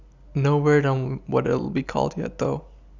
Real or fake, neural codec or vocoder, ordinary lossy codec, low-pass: real; none; none; 7.2 kHz